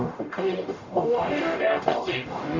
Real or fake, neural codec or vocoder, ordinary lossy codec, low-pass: fake; codec, 44.1 kHz, 0.9 kbps, DAC; none; 7.2 kHz